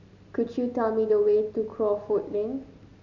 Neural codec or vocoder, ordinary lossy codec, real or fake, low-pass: none; none; real; 7.2 kHz